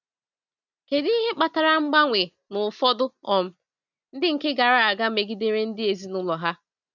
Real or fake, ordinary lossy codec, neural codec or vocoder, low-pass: real; none; none; 7.2 kHz